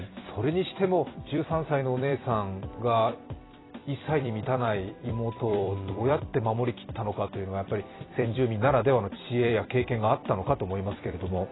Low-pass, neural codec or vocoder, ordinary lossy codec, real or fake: 7.2 kHz; vocoder, 44.1 kHz, 128 mel bands every 256 samples, BigVGAN v2; AAC, 16 kbps; fake